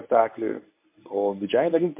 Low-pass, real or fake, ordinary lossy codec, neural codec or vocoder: 3.6 kHz; fake; MP3, 24 kbps; codec, 24 kHz, 0.9 kbps, WavTokenizer, medium speech release version 1